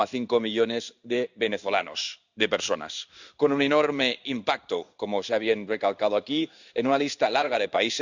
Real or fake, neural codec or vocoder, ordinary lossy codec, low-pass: fake; codec, 16 kHz in and 24 kHz out, 1 kbps, XY-Tokenizer; Opus, 64 kbps; 7.2 kHz